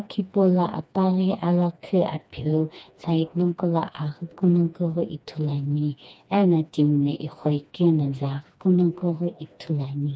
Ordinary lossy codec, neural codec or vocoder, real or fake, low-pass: none; codec, 16 kHz, 2 kbps, FreqCodec, smaller model; fake; none